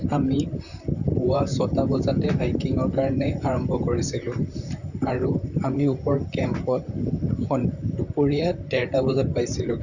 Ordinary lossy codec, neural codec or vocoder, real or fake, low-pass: none; vocoder, 44.1 kHz, 128 mel bands, Pupu-Vocoder; fake; 7.2 kHz